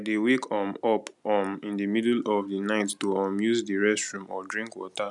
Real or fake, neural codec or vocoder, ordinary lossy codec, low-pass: real; none; none; 10.8 kHz